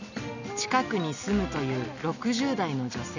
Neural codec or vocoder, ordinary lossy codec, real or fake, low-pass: none; none; real; 7.2 kHz